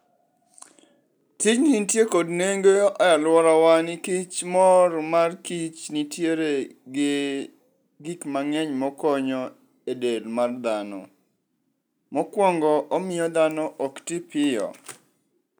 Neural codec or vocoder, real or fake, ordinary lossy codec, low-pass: none; real; none; none